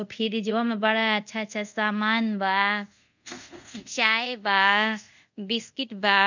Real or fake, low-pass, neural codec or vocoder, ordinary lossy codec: fake; 7.2 kHz; codec, 24 kHz, 0.5 kbps, DualCodec; none